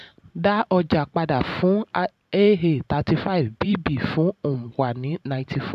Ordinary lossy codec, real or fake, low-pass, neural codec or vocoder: none; fake; 14.4 kHz; vocoder, 44.1 kHz, 128 mel bands every 512 samples, BigVGAN v2